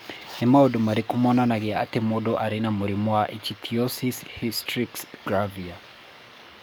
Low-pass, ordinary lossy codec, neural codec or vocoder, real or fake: none; none; codec, 44.1 kHz, 7.8 kbps, DAC; fake